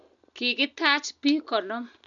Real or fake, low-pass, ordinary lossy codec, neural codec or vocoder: real; 7.2 kHz; none; none